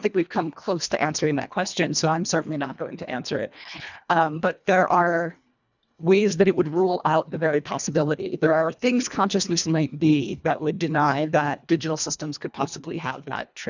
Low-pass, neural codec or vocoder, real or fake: 7.2 kHz; codec, 24 kHz, 1.5 kbps, HILCodec; fake